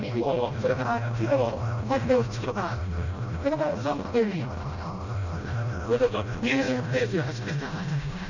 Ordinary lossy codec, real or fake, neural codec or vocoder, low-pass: none; fake; codec, 16 kHz, 0.5 kbps, FreqCodec, smaller model; 7.2 kHz